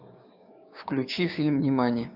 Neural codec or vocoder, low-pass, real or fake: codec, 16 kHz, 6 kbps, DAC; 5.4 kHz; fake